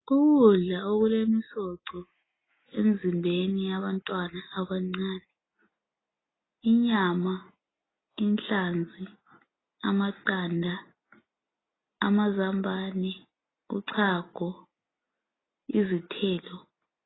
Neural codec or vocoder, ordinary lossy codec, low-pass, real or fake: none; AAC, 16 kbps; 7.2 kHz; real